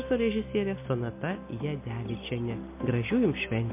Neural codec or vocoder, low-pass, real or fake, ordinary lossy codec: none; 3.6 kHz; real; MP3, 24 kbps